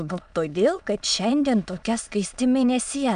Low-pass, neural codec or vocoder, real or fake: 9.9 kHz; autoencoder, 22.05 kHz, a latent of 192 numbers a frame, VITS, trained on many speakers; fake